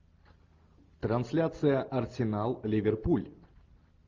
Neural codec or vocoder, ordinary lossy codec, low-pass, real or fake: none; Opus, 32 kbps; 7.2 kHz; real